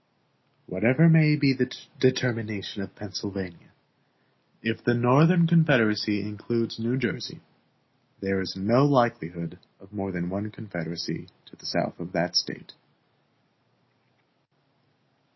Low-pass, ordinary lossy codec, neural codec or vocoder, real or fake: 7.2 kHz; MP3, 24 kbps; none; real